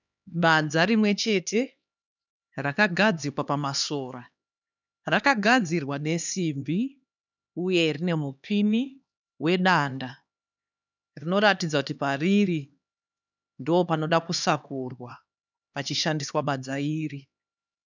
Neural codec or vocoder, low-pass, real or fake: codec, 16 kHz, 2 kbps, X-Codec, HuBERT features, trained on LibriSpeech; 7.2 kHz; fake